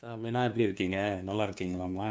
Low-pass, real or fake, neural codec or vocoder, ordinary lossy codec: none; fake; codec, 16 kHz, 2 kbps, FunCodec, trained on LibriTTS, 25 frames a second; none